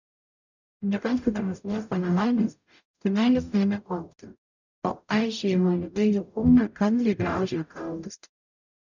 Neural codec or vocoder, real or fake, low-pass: codec, 44.1 kHz, 0.9 kbps, DAC; fake; 7.2 kHz